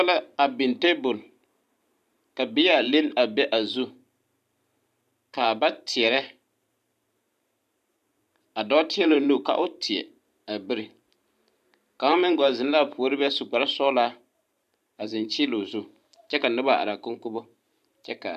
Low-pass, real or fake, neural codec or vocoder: 14.4 kHz; fake; vocoder, 44.1 kHz, 128 mel bands every 512 samples, BigVGAN v2